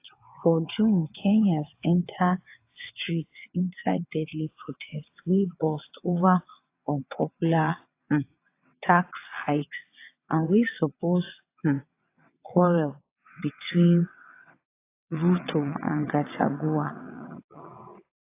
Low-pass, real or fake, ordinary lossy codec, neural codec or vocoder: 3.6 kHz; fake; AAC, 24 kbps; vocoder, 22.05 kHz, 80 mel bands, WaveNeXt